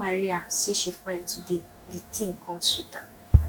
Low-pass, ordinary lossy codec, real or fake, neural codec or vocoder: 19.8 kHz; none; fake; codec, 44.1 kHz, 2.6 kbps, DAC